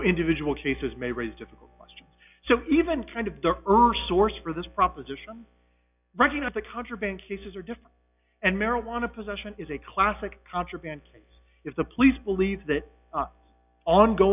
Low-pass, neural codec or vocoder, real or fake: 3.6 kHz; none; real